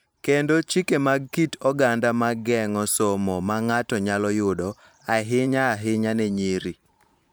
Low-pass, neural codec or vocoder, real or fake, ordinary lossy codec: none; vocoder, 44.1 kHz, 128 mel bands every 512 samples, BigVGAN v2; fake; none